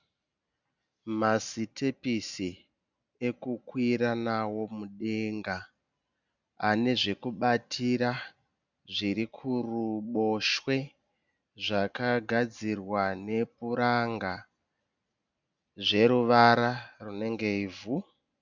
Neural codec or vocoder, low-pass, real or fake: none; 7.2 kHz; real